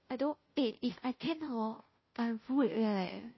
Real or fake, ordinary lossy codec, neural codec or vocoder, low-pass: fake; MP3, 24 kbps; codec, 16 kHz, 0.5 kbps, FunCodec, trained on Chinese and English, 25 frames a second; 7.2 kHz